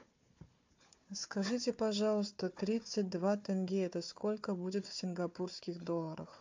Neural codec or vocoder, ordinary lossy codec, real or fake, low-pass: codec, 16 kHz, 4 kbps, FunCodec, trained on Chinese and English, 50 frames a second; MP3, 48 kbps; fake; 7.2 kHz